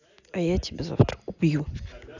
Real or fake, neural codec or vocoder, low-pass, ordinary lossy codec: real; none; 7.2 kHz; none